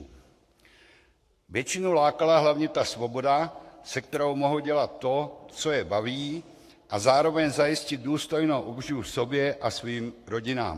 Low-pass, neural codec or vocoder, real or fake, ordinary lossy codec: 14.4 kHz; codec, 44.1 kHz, 7.8 kbps, Pupu-Codec; fake; AAC, 64 kbps